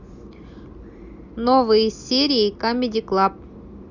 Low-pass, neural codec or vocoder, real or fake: 7.2 kHz; none; real